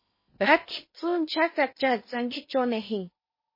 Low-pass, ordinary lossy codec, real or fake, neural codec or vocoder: 5.4 kHz; MP3, 24 kbps; fake; codec, 16 kHz in and 24 kHz out, 0.8 kbps, FocalCodec, streaming, 65536 codes